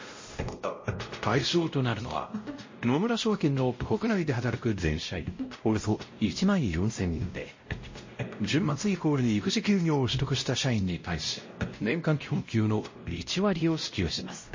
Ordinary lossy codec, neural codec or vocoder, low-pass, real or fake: MP3, 32 kbps; codec, 16 kHz, 0.5 kbps, X-Codec, WavLM features, trained on Multilingual LibriSpeech; 7.2 kHz; fake